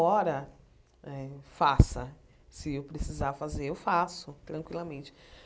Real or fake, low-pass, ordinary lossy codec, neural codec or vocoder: real; none; none; none